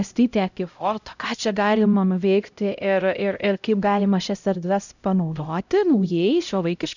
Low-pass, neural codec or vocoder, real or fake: 7.2 kHz; codec, 16 kHz, 0.5 kbps, X-Codec, HuBERT features, trained on LibriSpeech; fake